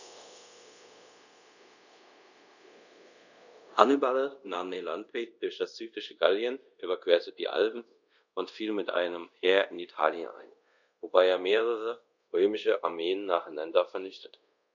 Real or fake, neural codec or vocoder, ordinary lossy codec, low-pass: fake; codec, 24 kHz, 0.5 kbps, DualCodec; none; 7.2 kHz